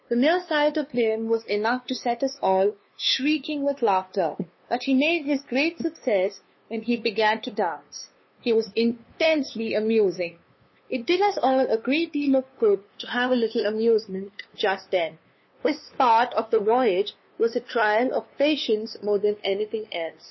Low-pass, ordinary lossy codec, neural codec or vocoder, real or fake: 7.2 kHz; MP3, 24 kbps; codec, 16 kHz, 2 kbps, FunCodec, trained on LibriTTS, 25 frames a second; fake